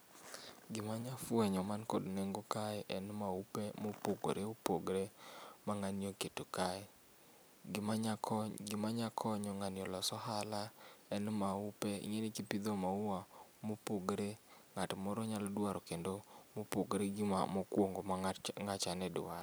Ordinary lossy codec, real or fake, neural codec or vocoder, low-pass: none; real; none; none